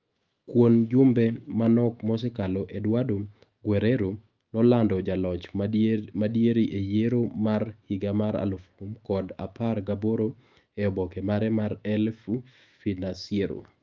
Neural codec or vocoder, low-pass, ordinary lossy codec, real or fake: autoencoder, 48 kHz, 128 numbers a frame, DAC-VAE, trained on Japanese speech; 7.2 kHz; Opus, 24 kbps; fake